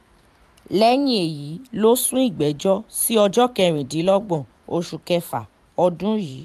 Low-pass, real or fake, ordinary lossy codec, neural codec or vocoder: 14.4 kHz; fake; AAC, 96 kbps; vocoder, 44.1 kHz, 128 mel bands every 512 samples, BigVGAN v2